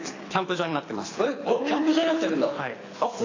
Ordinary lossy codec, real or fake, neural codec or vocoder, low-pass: AAC, 32 kbps; fake; codec, 24 kHz, 6 kbps, HILCodec; 7.2 kHz